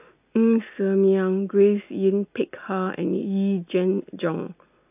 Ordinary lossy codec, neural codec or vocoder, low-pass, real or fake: AAC, 32 kbps; none; 3.6 kHz; real